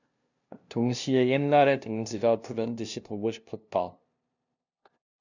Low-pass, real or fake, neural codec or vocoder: 7.2 kHz; fake; codec, 16 kHz, 0.5 kbps, FunCodec, trained on LibriTTS, 25 frames a second